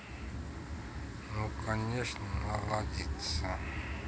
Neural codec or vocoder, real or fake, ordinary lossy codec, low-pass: none; real; none; none